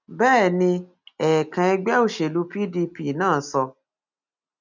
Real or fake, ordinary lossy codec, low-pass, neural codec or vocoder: real; none; 7.2 kHz; none